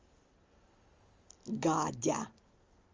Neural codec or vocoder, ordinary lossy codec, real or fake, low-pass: none; Opus, 32 kbps; real; 7.2 kHz